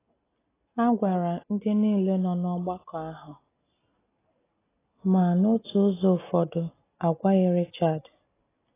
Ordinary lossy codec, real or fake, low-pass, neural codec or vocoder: AAC, 16 kbps; real; 3.6 kHz; none